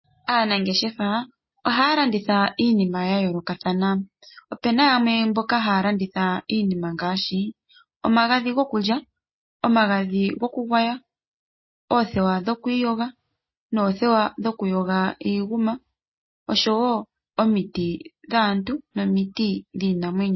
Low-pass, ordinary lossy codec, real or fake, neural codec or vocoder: 7.2 kHz; MP3, 24 kbps; real; none